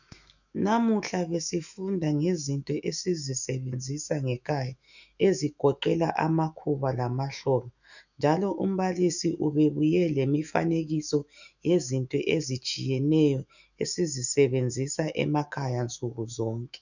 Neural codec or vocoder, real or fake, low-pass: autoencoder, 48 kHz, 128 numbers a frame, DAC-VAE, trained on Japanese speech; fake; 7.2 kHz